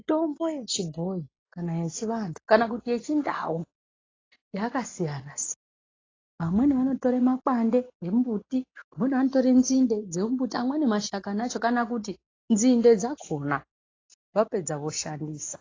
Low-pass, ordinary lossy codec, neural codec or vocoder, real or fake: 7.2 kHz; AAC, 32 kbps; none; real